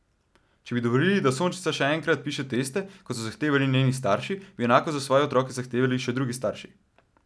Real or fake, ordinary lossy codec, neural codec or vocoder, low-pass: real; none; none; none